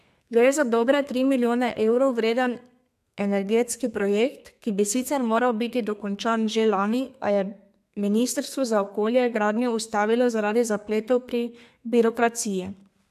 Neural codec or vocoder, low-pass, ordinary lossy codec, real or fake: codec, 32 kHz, 1.9 kbps, SNAC; 14.4 kHz; AAC, 96 kbps; fake